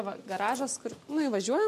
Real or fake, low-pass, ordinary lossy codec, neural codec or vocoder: real; 14.4 kHz; MP3, 64 kbps; none